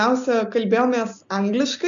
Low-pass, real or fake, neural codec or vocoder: 7.2 kHz; real; none